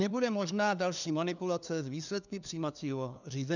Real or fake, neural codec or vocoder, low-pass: fake; codec, 16 kHz, 2 kbps, FunCodec, trained on LibriTTS, 25 frames a second; 7.2 kHz